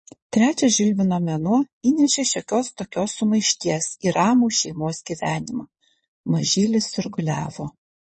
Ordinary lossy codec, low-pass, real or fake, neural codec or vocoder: MP3, 32 kbps; 9.9 kHz; fake; vocoder, 22.05 kHz, 80 mel bands, Vocos